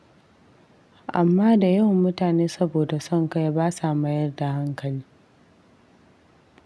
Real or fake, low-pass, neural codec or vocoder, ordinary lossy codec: real; none; none; none